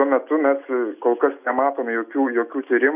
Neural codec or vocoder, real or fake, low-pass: none; real; 3.6 kHz